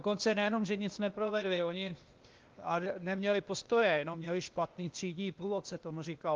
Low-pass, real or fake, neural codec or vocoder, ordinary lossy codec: 7.2 kHz; fake; codec, 16 kHz, 0.8 kbps, ZipCodec; Opus, 32 kbps